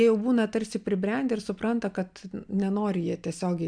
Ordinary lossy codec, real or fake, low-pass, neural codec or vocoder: MP3, 96 kbps; real; 9.9 kHz; none